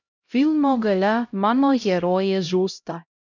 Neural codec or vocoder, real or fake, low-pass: codec, 16 kHz, 0.5 kbps, X-Codec, HuBERT features, trained on LibriSpeech; fake; 7.2 kHz